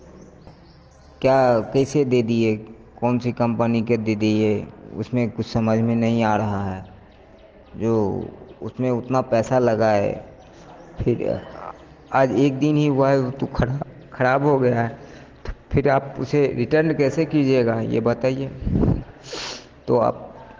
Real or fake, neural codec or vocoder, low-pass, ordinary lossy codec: real; none; 7.2 kHz; Opus, 16 kbps